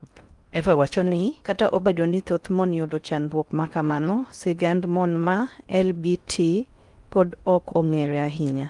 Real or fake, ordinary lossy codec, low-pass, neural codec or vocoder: fake; Opus, 32 kbps; 10.8 kHz; codec, 16 kHz in and 24 kHz out, 0.6 kbps, FocalCodec, streaming, 4096 codes